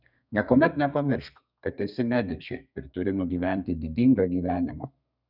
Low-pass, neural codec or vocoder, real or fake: 5.4 kHz; codec, 32 kHz, 1.9 kbps, SNAC; fake